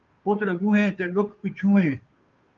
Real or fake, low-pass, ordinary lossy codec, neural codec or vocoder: fake; 7.2 kHz; Opus, 24 kbps; codec, 16 kHz, 2 kbps, FunCodec, trained on Chinese and English, 25 frames a second